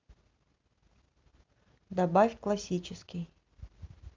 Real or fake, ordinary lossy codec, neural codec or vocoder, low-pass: real; Opus, 24 kbps; none; 7.2 kHz